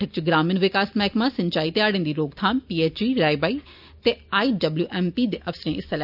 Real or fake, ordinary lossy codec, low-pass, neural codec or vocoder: real; none; 5.4 kHz; none